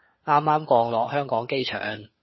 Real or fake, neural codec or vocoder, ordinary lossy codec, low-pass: fake; codec, 16 kHz, 4 kbps, FunCodec, trained on LibriTTS, 50 frames a second; MP3, 24 kbps; 7.2 kHz